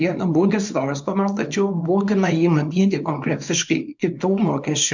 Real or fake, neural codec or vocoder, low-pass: fake; codec, 24 kHz, 0.9 kbps, WavTokenizer, small release; 7.2 kHz